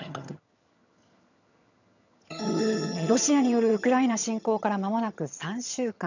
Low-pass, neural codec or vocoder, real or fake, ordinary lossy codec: 7.2 kHz; vocoder, 22.05 kHz, 80 mel bands, HiFi-GAN; fake; none